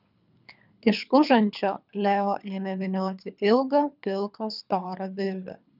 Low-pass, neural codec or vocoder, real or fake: 5.4 kHz; codec, 24 kHz, 3 kbps, HILCodec; fake